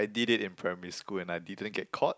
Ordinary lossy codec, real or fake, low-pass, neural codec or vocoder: none; real; none; none